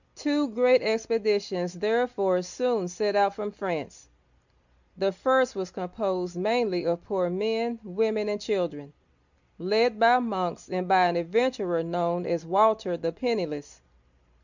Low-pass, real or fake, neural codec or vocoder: 7.2 kHz; real; none